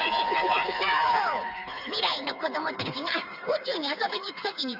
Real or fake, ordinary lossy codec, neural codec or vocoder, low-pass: fake; Opus, 64 kbps; codec, 16 kHz, 4 kbps, FreqCodec, smaller model; 5.4 kHz